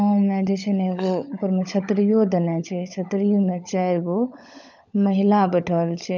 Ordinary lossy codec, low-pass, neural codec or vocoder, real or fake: none; 7.2 kHz; codec, 16 kHz, 16 kbps, FunCodec, trained on LibriTTS, 50 frames a second; fake